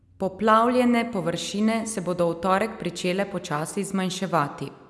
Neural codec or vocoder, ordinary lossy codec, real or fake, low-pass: none; none; real; none